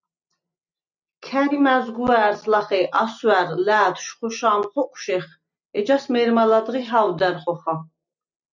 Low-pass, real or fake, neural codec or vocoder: 7.2 kHz; real; none